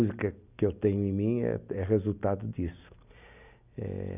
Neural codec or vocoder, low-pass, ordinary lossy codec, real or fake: none; 3.6 kHz; none; real